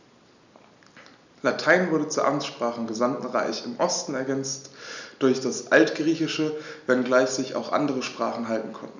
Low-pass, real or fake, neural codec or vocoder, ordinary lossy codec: 7.2 kHz; real; none; none